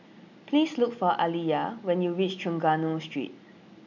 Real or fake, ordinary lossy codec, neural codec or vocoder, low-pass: real; none; none; 7.2 kHz